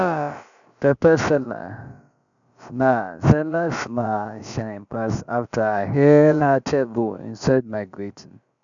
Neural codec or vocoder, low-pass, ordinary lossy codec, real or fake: codec, 16 kHz, about 1 kbps, DyCAST, with the encoder's durations; 7.2 kHz; none; fake